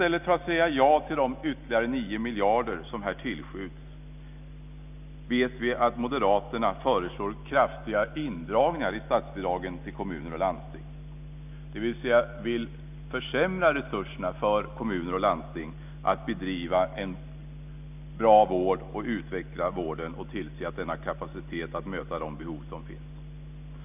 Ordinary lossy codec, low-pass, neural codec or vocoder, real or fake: none; 3.6 kHz; none; real